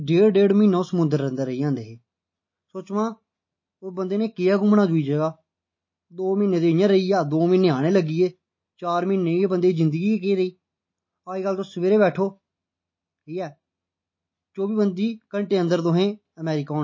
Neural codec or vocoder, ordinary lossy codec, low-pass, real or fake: none; MP3, 32 kbps; 7.2 kHz; real